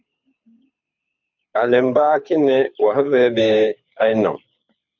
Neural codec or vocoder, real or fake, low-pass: codec, 24 kHz, 6 kbps, HILCodec; fake; 7.2 kHz